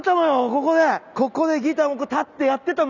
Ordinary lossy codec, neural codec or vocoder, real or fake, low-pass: none; none; real; 7.2 kHz